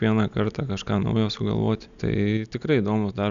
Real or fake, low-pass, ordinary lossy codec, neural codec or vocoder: real; 7.2 kHz; MP3, 96 kbps; none